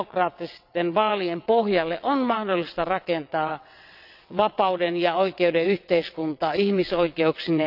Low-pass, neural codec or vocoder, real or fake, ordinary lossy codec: 5.4 kHz; vocoder, 22.05 kHz, 80 mel bands, WaveNeXt; fake; none